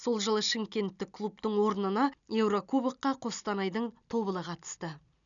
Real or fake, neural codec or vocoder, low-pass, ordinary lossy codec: real; none; 7.2 kHz; none